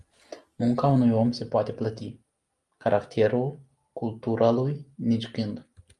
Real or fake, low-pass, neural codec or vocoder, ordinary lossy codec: real; 10.8 kHz; none; Opus, 32 kbps